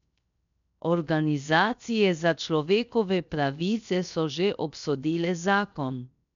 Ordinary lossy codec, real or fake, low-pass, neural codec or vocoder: MP3, 96 kbps; fake; 7.2 kHz; codec, 16 kHz, 0.7 kbps, FocalCodec